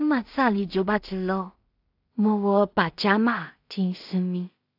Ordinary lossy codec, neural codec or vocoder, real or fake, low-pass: AAC, 48 kbps; codec, 16 kHz in and 24 kHz out, 0.4 kbps, LongCat-Audio-Codec, two codebook decoder; fake; 5.4 kHz